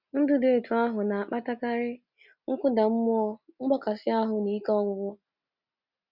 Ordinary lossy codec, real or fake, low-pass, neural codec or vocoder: Opus, 64 kbps; real; 5.4 kHz; none